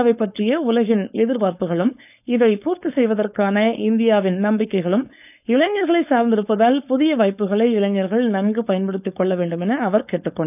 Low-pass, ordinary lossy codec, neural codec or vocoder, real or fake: 3.6 kHz; none; codec, 16 kHz, 4.8 kbps, FACodec; fake